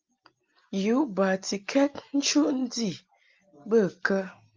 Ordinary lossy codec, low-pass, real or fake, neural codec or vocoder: Opus, 24 kbps; 7.2 kHz; real; none